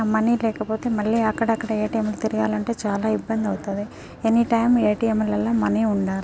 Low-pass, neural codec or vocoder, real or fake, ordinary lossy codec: none; none; real; none